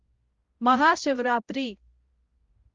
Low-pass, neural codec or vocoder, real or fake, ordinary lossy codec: 7.2 kHz; codec, 16 kHz, 0.5 kbps, X-Codec, HuBERT features, trained on balanced general audio; fake; Opus, 32 kbps